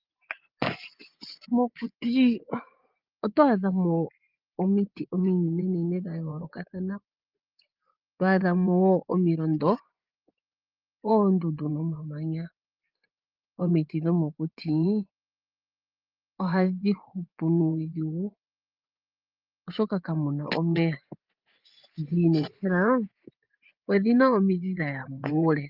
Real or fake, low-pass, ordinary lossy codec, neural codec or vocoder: fake; 5.4 kHz; Opus, 24 kbps; vocoder, 24 kHz, 100 mel bands, Vocos